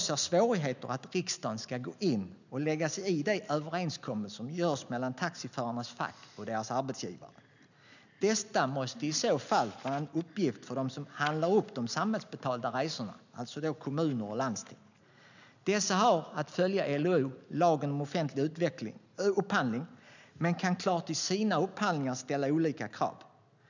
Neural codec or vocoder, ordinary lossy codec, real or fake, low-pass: none; none; real; 7.2 kHz